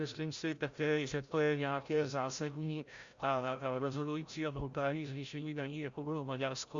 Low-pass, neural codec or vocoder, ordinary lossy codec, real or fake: 7.2 kHz; codec, 16 kHz, 0.5 kbps, FreqCodec, larger model; Opus, 64 kbps; fake